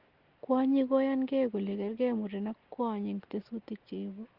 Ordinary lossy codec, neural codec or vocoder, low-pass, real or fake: Opus, 16 kbps; none; 5.4 kHz; real